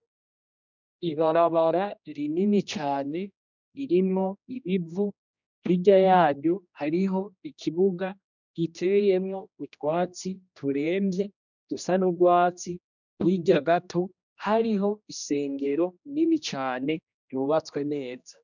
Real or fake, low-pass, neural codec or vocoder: fake; 7.2 kHz; codec, 16 kHz, 1 kbps, X-Codec, HuBERT features, trained on general audio